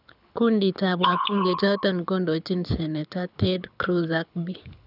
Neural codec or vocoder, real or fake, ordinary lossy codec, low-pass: codec, 24 kHz, 6 kbps, HILCodec; fake; none; 5.4 kHz